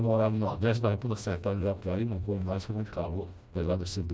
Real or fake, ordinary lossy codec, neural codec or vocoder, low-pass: fake; none; codec, 16 kHz, 1 kbps, FreqCodec, smaller model; none